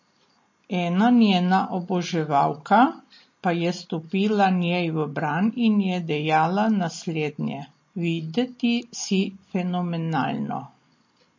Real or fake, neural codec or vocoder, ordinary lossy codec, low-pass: real; none; MP3, 32 kbps; 7.2 kHz